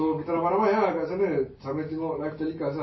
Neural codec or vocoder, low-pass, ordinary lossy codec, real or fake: none; 7.2 kHz; MP3, 24 kbps; real